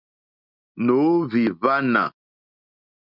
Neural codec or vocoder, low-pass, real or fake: none; 5.4 kHz; real